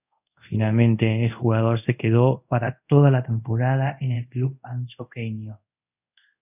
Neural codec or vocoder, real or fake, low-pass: codec, 24 kHz, 0.5 kbps, DualCodec; fake; 3.6 kHz